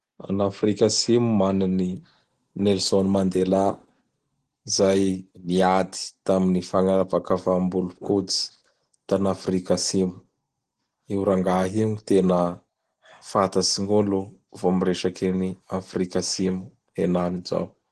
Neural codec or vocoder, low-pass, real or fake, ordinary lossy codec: none; 10.8 kHz; real; Opus, 16 kbps